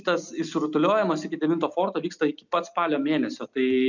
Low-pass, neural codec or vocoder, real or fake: 7.2 kHz; none; real